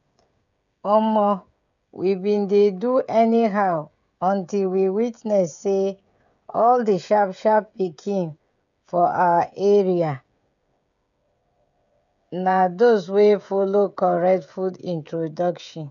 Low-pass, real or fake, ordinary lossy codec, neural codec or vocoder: 7.2 kHz; fake; none; codec, 16 kHz, 16 kbps, FreqCodec, smaller model